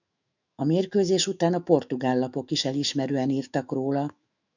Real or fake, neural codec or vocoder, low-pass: fake; autoencoder, 48 kHz, 128 numbers a frame, DAC-VAE, trained on Japanese speech; 7.2 kHz